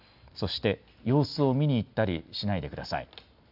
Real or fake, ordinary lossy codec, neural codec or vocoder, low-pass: real; none; none; 5.4 kHz